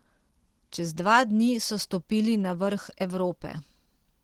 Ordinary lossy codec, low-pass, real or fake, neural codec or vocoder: Opus, 16 kbps; 19.8 kHz; real; none